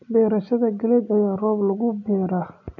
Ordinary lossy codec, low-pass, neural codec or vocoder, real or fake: none; 7.2 kHz; none; real